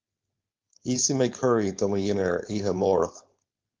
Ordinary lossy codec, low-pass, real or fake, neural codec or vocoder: Opus, 24 kbps; 7.2 kHz; fake; codec, 16 kHz, 4.8 kbps, FACodec